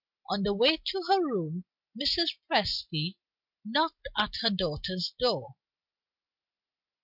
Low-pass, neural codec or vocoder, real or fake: 5.4 kHz; none; real